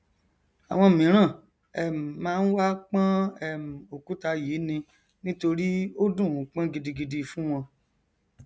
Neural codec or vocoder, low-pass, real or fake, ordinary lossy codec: none; none; real; none